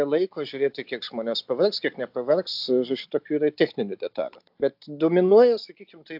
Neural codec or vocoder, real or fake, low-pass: none; real; 5.4 kHz